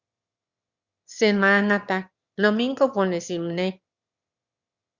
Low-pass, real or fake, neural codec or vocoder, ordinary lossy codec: 7.2 kHz; fake; autoencoder, 22.05 kHz, a latent of 192 numbers a frame, VITS, trained on one speaker; Opus, 64 kbps